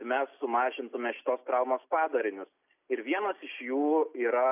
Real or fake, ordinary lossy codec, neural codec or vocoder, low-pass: real; AAC, 32 kbps; none; 3.6 kHz